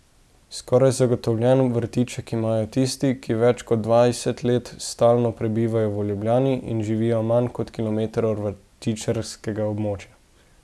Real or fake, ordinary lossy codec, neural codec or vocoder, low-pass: real; none; none; none